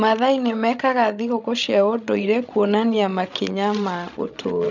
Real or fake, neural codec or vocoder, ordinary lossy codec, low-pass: fake; codec, 16 kHz, 16 kbps, FreqCodec, larger model; none; 7.2 kHz